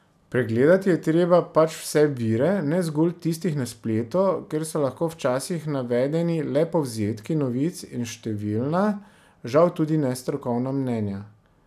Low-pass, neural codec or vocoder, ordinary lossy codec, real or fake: 14.4 kHz; none; none; real